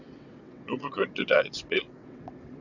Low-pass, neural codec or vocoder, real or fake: 7.2 kHz; vocoder, 22.05 kHz, 80 mel bands, WaveNeXt; fake